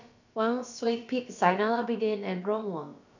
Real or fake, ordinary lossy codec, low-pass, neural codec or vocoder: fake; none; 7.2 kHz; codec, 16 kHz, about 1 kbps, DyCAST, with the encoder's durations